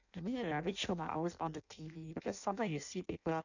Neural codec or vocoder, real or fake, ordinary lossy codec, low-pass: codec, 16 kHz in and 24 kHz out, 0.6 kbps, FireRedTTS-2 codec; fake; none; 7.2 kHz